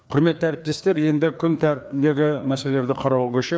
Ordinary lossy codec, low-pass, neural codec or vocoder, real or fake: none; none; codec, 16 kHz, 2 kbps, FreqCodec, larger model; fake